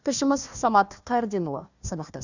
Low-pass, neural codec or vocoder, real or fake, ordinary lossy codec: 7.2 kHz; codec, 16 kHz, 1 kbps, FunCodec, trained on Chinese and English, 50 frames a second; fake; none